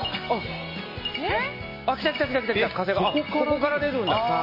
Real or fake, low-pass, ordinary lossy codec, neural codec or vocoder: real; 5.4 kHz; MP3, 24 kbps; none